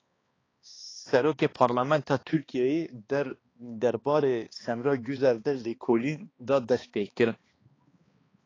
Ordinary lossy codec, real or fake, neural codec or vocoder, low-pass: AAC, 32 kbps; fake; codec, 16 kHz, 2 kbps, X-Codec, HuBERT features, trained on balanced general audio; 7.2 kHz